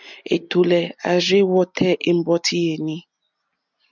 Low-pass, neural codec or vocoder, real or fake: 7.2 kHz; none; real